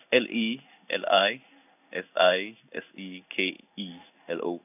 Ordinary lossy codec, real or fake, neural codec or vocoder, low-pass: none; fake; codec, 16 kHz in and 24 kHz out, 1 kbps, XY-Tokenizer; 3.6 kHz